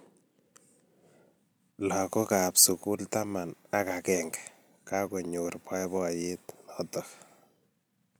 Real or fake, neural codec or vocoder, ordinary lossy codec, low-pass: real; none; none; none